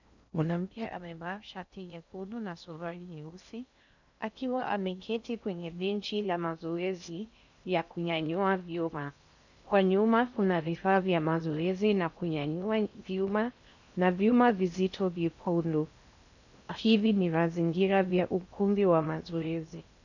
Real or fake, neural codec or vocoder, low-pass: fake; codec, 16 kHz in and 24 kHz out, 0.6 kbps, FocalCodec, streaming, 4096 codes; 7.2 kHz